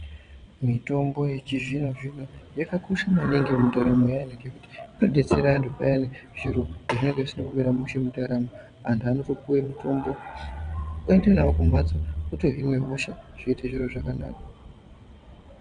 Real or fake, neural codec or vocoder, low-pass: fake; vocoder, 22.05 kHz, 80 mel bands, WaveNeXt; 9.9 kHz